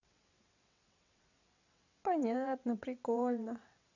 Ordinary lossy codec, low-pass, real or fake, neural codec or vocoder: none; 7.2 kHz; fake; vocoder, 22.05 kHz, 80 mel bands, WaveNeXt